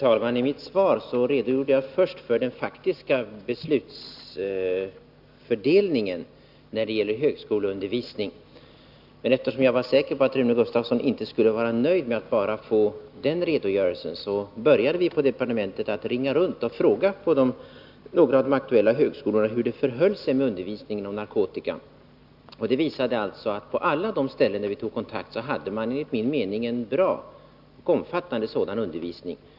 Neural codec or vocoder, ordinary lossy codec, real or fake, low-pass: none; none; real; 5.4 kHz